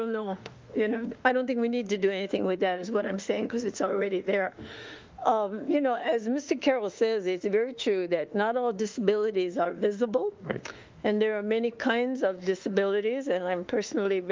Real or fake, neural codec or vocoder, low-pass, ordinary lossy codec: fake; autoencoder, 48 kHz, 32 numbers a frame, DAC-VAE, trained on Japanese speech; 7.2 kHz; Opus, 24 kbps